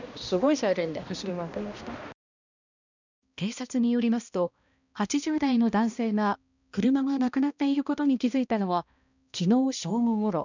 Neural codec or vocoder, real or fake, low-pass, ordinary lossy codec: codec, 16 kHz, 1 kbps, X-Codec, HuBERT features, trained on balanced general audio; fake; 7.2 kHz; none